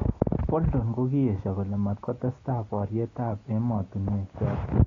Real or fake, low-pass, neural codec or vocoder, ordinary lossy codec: real; 7.2 kHz; none; AAC, 32 kbps